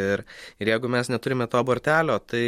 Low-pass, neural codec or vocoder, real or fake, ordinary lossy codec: 19.8 kHz; none; real; MP3, 64 kbps